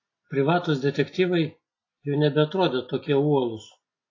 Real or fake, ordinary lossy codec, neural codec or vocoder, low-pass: real; AAC, 32 kbps; none; 7.2 kHz